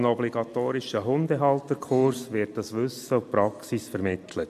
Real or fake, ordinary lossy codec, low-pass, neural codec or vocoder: real; MP3, 64 kbps; 14.4 kHz; none